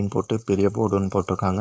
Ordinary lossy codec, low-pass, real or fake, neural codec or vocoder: none; none; fake; codec, 16 kHz, 4.8 kbps, FACodec